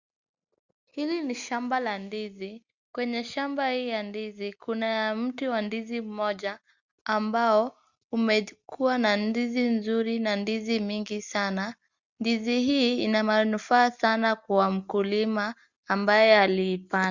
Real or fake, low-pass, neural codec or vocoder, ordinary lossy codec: real; 7.2 kHz; none; Opus, 64 kbps